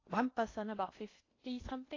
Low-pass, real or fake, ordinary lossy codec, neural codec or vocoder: 7.2 kHz; fake; AAC, 32 kbps; codec, 16 kHz in and 24 kHz out, 0.8 kbps, FocalCodec, streaming, 65536 codes